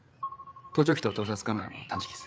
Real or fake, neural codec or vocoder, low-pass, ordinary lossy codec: fake; codec, 16 kHz, 8 kbps, FreqCodec, larger model; none; none